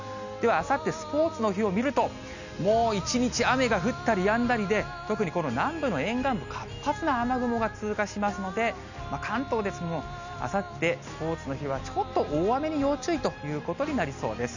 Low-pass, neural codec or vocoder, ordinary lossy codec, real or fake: 7.2 kHz; none; none; real